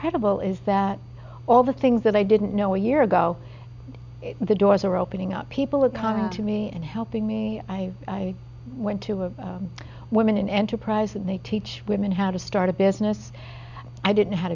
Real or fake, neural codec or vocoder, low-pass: real; none; 7.2 kHz